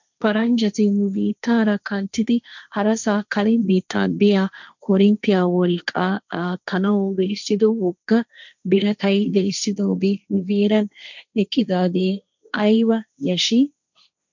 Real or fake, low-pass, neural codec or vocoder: fake; 7.2 kHz; codec, 16 kHz, 1.1 kbps, Voila-Tokenizer